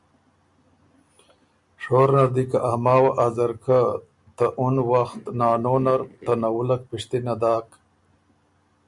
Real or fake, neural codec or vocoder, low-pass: real; none; 10.8 kHz